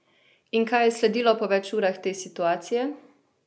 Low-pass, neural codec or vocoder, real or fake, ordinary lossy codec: none; none; real; none